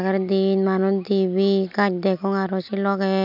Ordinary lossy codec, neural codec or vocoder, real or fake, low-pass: none; none; real; 5.4 kHz